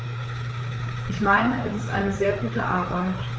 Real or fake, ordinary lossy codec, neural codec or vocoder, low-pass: fake; none; codec, 16 kHz, 4 kbps, FreqCodec, larger model; none